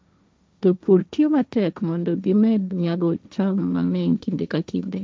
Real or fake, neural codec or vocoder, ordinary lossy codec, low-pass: fake; codec, 16 kHz, 1.1 kbps, Voila-Tokenizer; none; none